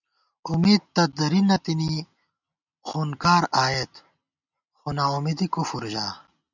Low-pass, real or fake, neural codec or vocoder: 7.2 kHz; real; none